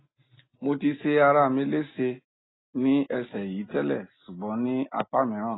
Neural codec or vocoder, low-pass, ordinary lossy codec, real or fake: none; 7.2 kHz; AAC, 16 kbps; real